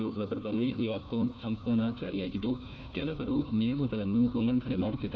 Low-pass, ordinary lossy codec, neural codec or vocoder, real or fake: none; none; codec, 16 kHz, 1 kbps, FunCodec, trained on Chinese and English, 50 frames a second; fake